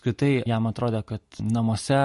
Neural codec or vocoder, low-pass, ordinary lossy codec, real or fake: none; 14.4 kHz; MP3, 48 kbps; real